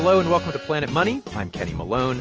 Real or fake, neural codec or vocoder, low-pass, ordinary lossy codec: real; none; 7.2 kHz; Opus, 24 kbps